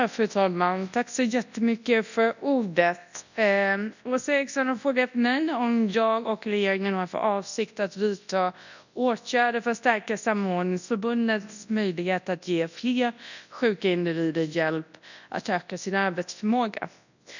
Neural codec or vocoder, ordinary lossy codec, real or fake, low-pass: codec, 24 kHz, 0.9 kbps, WavTokenizer, large speech release; none; fake; 7.2 kHz